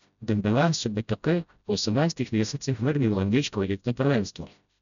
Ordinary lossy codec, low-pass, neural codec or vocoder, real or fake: none; 7.2 kHz; codec, 16 kHz, 0.5 kbps, FreqCodec, smaller model; fake